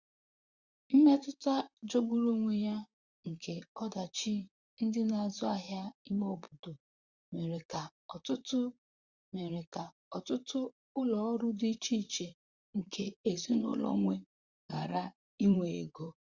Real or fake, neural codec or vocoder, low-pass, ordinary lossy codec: fake; vocoder, 44.1 kHz, 128 mel bands, Pupu-Vocoder; 7.2 kHz; Opus, 64 kbps